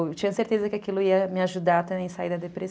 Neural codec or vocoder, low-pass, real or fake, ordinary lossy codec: none; none; real; none